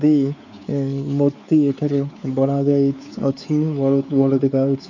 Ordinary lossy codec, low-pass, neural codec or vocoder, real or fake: none; 7.2 kHz; codec, 16 kHz, 4 kbps, X-Codec, WavLM features, trained on Multilingual LibriSpeech; fake